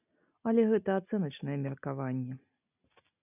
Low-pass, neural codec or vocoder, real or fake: 3.6 kHz; none; real